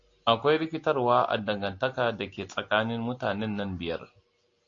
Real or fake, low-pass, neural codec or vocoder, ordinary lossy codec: real; 7.2 kHz; none; AAC, 48 kbps